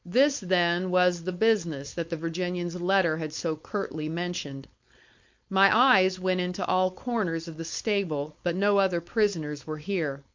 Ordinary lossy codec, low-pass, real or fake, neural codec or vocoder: MP3, 48 kbps; 7.2 kHz; fake; codec, 16 kHz, 4.8 kbps, FACodec